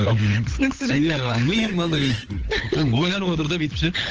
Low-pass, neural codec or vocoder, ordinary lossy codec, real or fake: 7.2 kHz; codec, 16 kHz, 16 kbps, FunCodec, trained on LibriTTS, 50 frames a second; Opus, 16 kbps; fake